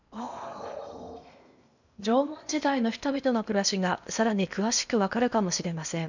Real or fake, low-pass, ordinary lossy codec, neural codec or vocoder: fake; 7.2 kHz; none; codec, 16 kHz in and 24 kHz out, 0.8 kbps, FocalCodec, streaming, 65536 codes